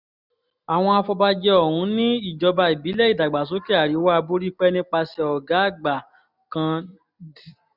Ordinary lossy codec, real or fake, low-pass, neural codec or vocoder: none; real; 5.4 kHz; none